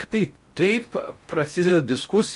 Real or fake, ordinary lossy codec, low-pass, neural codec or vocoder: fake; MP3, 64 kbps; 10.8 kHz; codec, 16 kHz in and 24 kHz out, 0.6 kbps, FocalCodec, streaming, 4096 codes